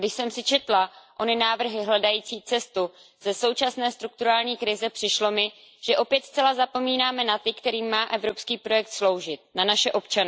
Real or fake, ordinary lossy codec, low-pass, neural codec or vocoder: real; none; none; none